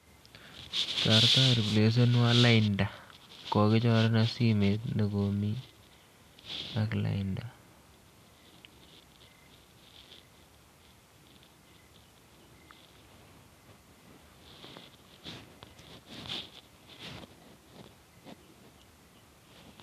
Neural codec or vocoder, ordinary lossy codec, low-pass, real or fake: none; none; 14.4 kHz; real